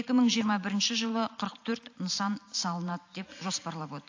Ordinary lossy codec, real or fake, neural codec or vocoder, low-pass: AAC, 48 kbps; real; none; 7.2 kHz